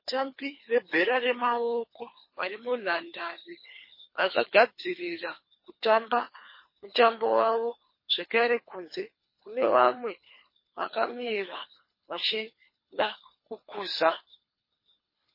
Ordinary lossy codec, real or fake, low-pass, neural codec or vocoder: MP3, 24 kbps; fake; 5.4 kHz; codec, 24 kHz, 3 kbps, HILCodec